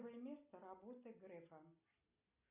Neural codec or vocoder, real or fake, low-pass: none; real; 3.6 kHz